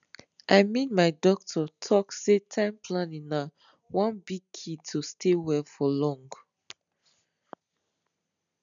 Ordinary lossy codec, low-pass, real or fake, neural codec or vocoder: none; 7.2 kHz; real; none